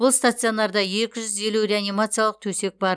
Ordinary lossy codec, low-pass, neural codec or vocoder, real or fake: none; none; none; real